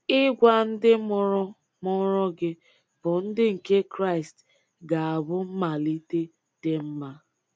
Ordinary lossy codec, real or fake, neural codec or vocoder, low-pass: none; real; none; none